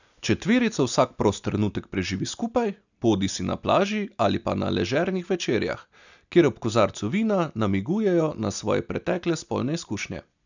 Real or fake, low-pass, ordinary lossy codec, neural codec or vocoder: real; 7.2 kHz; none; none